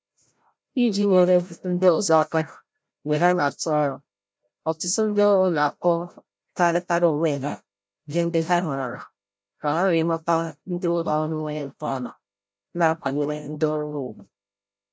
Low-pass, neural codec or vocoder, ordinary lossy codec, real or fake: none; codec, 16 kHz, 0.5 kbps, FreqCodec, larger model; none; fake